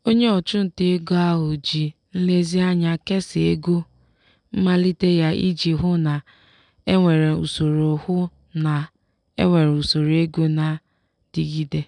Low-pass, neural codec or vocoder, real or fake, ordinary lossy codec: 10.8 kHz; none; real; none